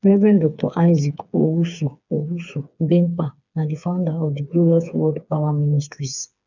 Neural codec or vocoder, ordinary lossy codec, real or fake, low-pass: codec, 16 kHz, 4 kbps, FreqCodec, smaller model; none; fake; 7.2 kHz